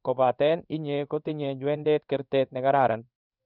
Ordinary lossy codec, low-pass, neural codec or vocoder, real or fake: none; 5.4 kHz; codec, 16 kHz in and 24 kHz out, 1 kbps, XY-Tokenizer; fake